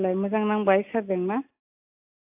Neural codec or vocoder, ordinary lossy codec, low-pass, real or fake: none; AAC, 32 kbps; 3.6 kHz; real